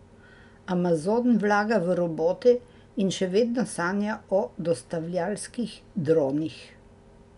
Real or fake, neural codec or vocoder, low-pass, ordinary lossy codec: real; none; 10.8 kHz; none